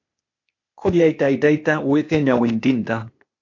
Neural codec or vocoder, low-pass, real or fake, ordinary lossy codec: codec, 16 kHz, 0.8 kbps, ZipCodec; 7.2 kHz; fake; MP3, 48 kbps